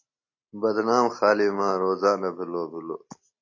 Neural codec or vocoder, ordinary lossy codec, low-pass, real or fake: none; AAC, 48 kbps; 7.2 kHz; real